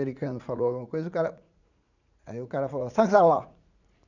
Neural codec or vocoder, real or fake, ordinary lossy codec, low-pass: vocoder, 44.1 kHz, 80 mel bands, Vocos; fake; none; 7.2 kHz